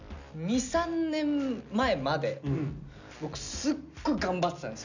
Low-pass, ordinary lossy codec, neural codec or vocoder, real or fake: 7.2 kHz; none; none; real